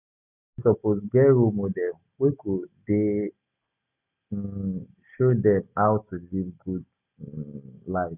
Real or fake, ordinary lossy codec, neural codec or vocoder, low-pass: real; none; none; 3.6 kHz